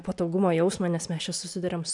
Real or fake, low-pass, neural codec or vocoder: real; 10.8 kHz; none